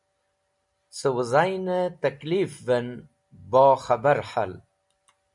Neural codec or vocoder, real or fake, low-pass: none; real; 10.8 kHz